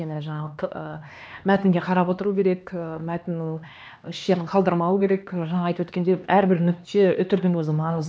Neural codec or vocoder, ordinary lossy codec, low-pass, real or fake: codec, 16 kHz, 2 kbps, X-Codec, HuBERT features, trained on LibriSpeech; none; none; fake